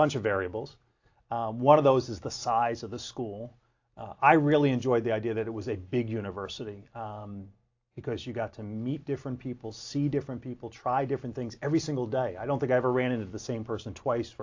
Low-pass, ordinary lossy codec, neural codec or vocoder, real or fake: 7.2 kHz; AAC, 48 kbps; none; real